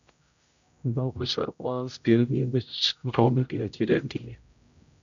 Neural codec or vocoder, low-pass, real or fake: codec, 16 kHz, 0.5 kbps, X-Codec, HuBERT features, trained on general audio; 7.2 kHz; fake